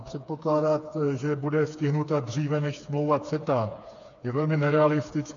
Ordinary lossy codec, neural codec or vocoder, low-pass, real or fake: AAC, 48 kbps; codec, 16 kHz, 4 kbps, FreqCodec, smaller model; 7.2 kHz; fake